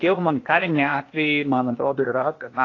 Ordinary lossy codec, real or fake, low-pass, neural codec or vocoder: AAC, 32 kbps; fake; 7.2 kHz; codec, 16 kHz, 0.8 kbps, ZipCodec